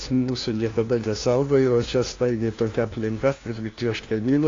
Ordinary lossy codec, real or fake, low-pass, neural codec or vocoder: AAC, 32 kbps; fake; 7.2 kHz; codec, 16 kHz, 1 kbps, FunCodec, trained on LibriTTS, 50 frames a second